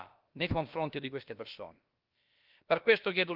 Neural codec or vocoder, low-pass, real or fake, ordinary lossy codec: codec, 16 kHz, about 1 kbps, DyCAST, with the encoder's durations; 5.4 kHz; fake; Opus, 24 kbps